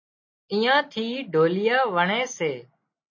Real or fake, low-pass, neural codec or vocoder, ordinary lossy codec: real; 7.2 kHz; none; MP3, 32 kbps